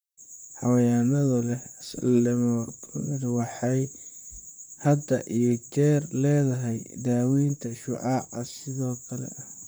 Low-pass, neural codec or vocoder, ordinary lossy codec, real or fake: none; vocoder, 44.1 kHz, 128 mel bands, Pupu-Vocoder; none; fake